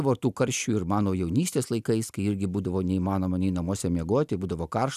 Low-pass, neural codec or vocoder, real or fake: 14.4 kHz; none; real